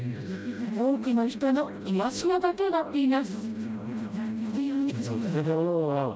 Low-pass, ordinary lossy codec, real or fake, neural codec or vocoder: none; none; fake; codec, 16 kHz, 0.5 kbps, FreqCodec, smaller model